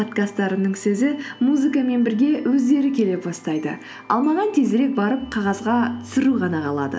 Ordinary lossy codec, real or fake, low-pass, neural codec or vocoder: none; real; none; none